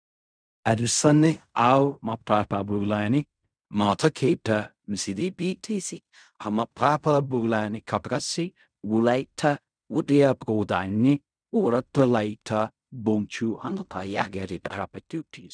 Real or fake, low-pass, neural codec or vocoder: fake; 9.9 kHz; codec, 16 kHz in and 24 kHz out, 0.4 kbps, LongCat-Audio-Codec, fine tuned four codebook decoder